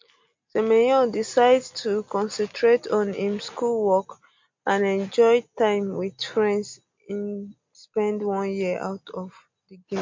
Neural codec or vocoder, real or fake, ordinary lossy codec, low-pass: none; real; MP3, 48 kbps; 7.2 kHz